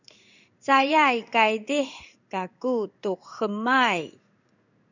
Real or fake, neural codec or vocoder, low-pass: fake; codec, 16 kHz in and 24 kHz out, 1 kbps, XY-Tokenizer; 7.2 kHz